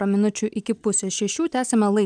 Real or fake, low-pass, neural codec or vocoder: real; 9.9 kHz; none